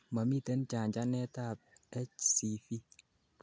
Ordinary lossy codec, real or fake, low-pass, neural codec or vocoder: none; real; none; none